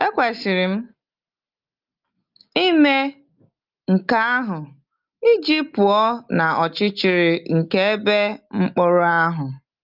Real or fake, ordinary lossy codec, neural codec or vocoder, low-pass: real; Opus, 24 kbps; none; 5.4 kHz